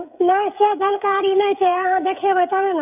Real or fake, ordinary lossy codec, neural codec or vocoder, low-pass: fake; none; codec, 16 kHz, 16 kbps, FreqCodec, smaller model; 3.6 kHz